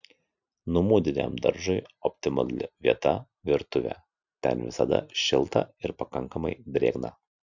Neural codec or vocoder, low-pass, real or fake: none; 7.2 kHz; real